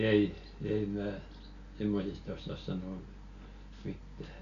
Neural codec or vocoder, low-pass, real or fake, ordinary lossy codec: none; 7.2 kHz; real; none